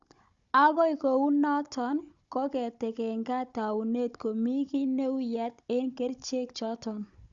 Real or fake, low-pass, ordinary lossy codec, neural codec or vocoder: fake; 7.2 kHz; Opus, 64 kbps; codec, 16 kHz, 16 kbps, FunCodec, trained on Chinese and English, 50 frames a second